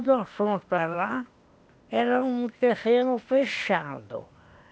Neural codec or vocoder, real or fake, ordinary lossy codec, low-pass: codec, 16 kHz, 0.8 kbps, ZipCodec; fake; none; none